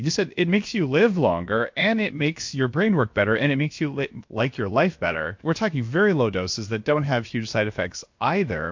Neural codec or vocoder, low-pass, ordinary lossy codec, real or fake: codec, 16 kHz, about 1 kbps, DyCAST, with the encoder's durations; 7.2 kHz; MP3, 48 kbps; fake